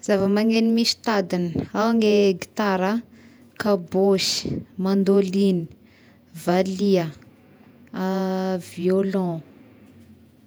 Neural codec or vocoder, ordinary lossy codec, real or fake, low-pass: vocoder, 48 kHz, 128 mel bands, Vocos; none; fake; none